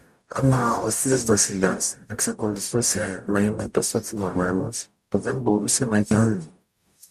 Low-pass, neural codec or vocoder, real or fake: 14.4 kHz; codec, 44.1 kHz, 0.9 kbps, DAC; fake